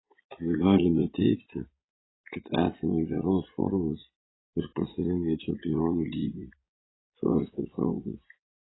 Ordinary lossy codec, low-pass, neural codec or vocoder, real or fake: AAC, 16 kbps; 7.2 kHz; vocoder, 22.05 kHz, 80 mel bands, Vocos; fake